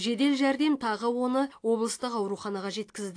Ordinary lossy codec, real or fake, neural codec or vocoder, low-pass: AAC, 48 kbps; real; none; 9.9 kHz